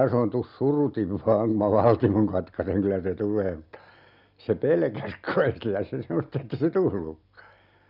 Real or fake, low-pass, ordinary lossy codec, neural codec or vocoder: real; 5.4 kHz; none; none